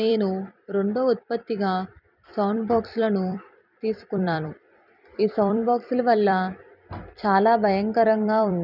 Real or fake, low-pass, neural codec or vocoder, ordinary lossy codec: fake; 5.4 kHz; vocoder, 44.1 kHz, 128 mel bands every 256 samples, BigVGAN v2; none